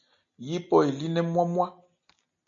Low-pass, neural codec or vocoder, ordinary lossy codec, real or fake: 7.2 kHz; none; MP3, 96 kbps; real